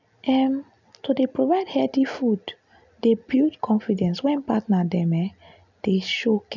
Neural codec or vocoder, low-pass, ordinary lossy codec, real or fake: none; 7.2 kHz; none; real